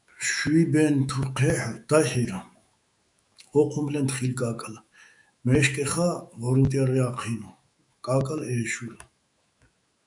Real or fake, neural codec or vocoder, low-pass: fake; autoencoder, 48 kHz, 128 numbers a frame, DAC-VAE, trained on Japanese speech; 10.8 kHz